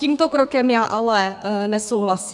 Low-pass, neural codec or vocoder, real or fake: 10.8 kHz; codec, 32 kHz, 1.9 kbps, SNAC; fake